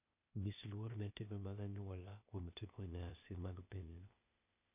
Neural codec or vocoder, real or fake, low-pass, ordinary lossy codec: codec, 16 kHz, 0.8 kbps, ZipCodec; fake; 3.6 kHz; none